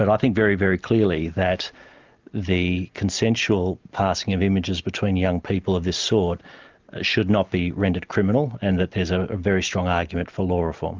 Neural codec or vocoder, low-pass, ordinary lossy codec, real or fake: none; 7.2 kHz; Opus, 24 kbps; real